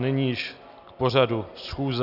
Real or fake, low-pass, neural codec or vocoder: real; 5.4 kHz; none